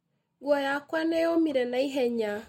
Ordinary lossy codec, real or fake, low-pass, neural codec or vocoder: MP3, 64 kbps; real; 19.8 kHz; none